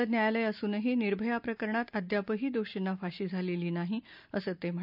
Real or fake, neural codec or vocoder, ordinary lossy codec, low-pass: real; none; none; 5.4 kHz